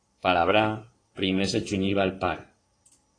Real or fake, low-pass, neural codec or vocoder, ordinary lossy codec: fake; 9.9 kHz; codec, 16 kHz in and 24 kHz out, 2.2 kbps, FireRedTTS-2 codec; AAC, 32 kbps